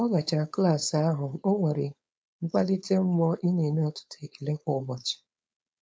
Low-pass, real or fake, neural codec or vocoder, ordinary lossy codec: none; fake; codec, 16 kHz, 4.8 kbps, FACodec; none